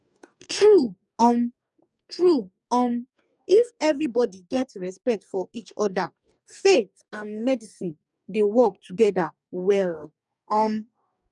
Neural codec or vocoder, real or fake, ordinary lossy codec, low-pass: codec, 44.1 kHz, 2.6 kbps, DAC; fake; none; 10.8 kHz